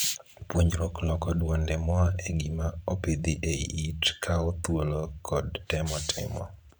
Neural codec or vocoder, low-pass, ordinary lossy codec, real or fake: vocoder, 44.1 kHz, 128 mel bands every 512 samples, BigVGAN v2; none; none; fake